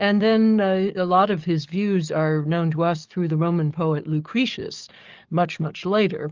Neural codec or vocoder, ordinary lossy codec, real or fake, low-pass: codec, 44.1 kHz, 7.8 kbps, DAC; Opus, 32 kbps; fake; 7.2 kHz